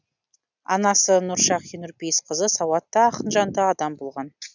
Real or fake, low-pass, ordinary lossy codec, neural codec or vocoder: real; 7.2 kHz; none; none